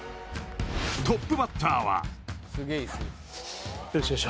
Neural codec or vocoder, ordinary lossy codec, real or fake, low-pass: none; none; real; none